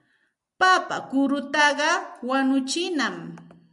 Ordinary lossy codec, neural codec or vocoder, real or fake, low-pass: MP3, 96 kbps; none; real; 10.8 kHz